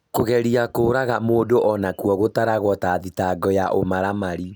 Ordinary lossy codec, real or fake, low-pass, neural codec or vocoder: none; real; none; none